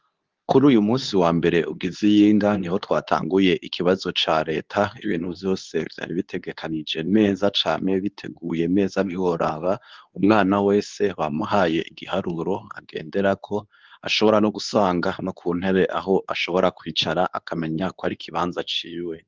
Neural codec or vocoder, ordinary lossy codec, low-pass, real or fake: codec, 24 kHz, 0.9 kbps, WavTokenizer, medium speech release version 2; Opus, 32 kbps; 7.2 kHz; fake